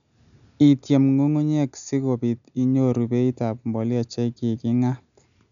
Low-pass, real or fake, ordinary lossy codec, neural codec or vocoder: 7.2 kHz; real; none; none